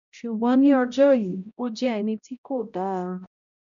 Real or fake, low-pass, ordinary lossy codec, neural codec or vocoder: fake; 7.2 kHz; none; codec, 16 kHz, 0.5 kbps, X-Codec, HuBERT features, trained on balanced general audio